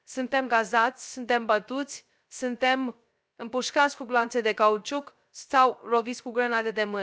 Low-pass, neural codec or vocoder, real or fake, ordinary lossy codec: none; codec, 16 kHz, 0.3 kbps, FocalCodec; fake; none